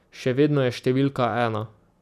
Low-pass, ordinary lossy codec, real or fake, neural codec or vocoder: 14.4 kHz; none; real; none